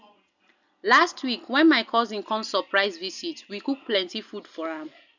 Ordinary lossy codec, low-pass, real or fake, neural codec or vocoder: none; 7.2 kHz; real; none